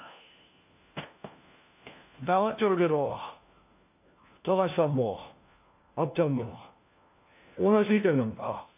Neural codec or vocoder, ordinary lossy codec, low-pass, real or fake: codec, 16 kHz, 1 kbps, FunCodec, trained on LibriTTS, 50 frames a second; AAC, 32 kbps; 3.6 kHz; fake